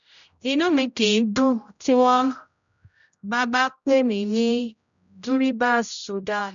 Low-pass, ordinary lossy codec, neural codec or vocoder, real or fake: 7.2 kHz; none; codec, 16 kHz, 0.5 kbps, X-Codec, HuBERT features, trained on general audio; fake